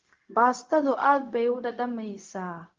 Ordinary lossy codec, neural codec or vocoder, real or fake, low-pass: Opus, 24 kbps; codec, 16 kHz, 0.4 kbps, LongCat-Audio-Codec; fake; 7.2 kHz